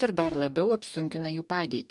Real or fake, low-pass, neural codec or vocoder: fake; 10.8 kHz; codec, 44.1 kHz, 2.6 kbps, DAC